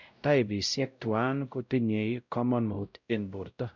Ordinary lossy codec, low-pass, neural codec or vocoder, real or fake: none; 7.2 kHz; codec, 16 kHz, 0.5 kbps, X-Codec, WavLM features, trained on Multilingual LibriSpeech; fake